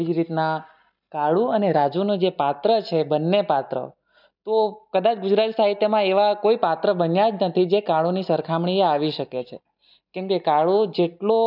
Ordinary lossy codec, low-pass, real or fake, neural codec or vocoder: none; 5.4 kHz; real; none